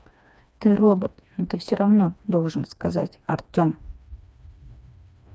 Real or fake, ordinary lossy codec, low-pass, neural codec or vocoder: fake; none; none; codec, 16 kHz, 2 kbps, FreqCodec, smaller model